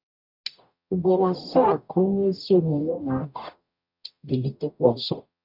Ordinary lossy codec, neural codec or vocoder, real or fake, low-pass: none; codec, 44.1 kHz, 0.9 kbps, DAC; fake; 5.4 kHz